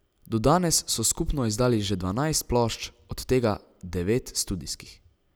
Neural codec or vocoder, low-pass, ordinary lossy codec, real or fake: none; none; none; real